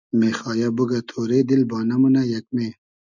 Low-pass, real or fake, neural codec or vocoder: 7.2 kHz; real; none